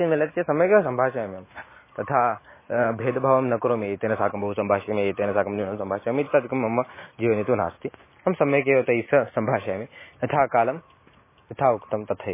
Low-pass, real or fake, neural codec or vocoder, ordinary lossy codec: 3.6 kHz; real; none; MP3, 16 kbps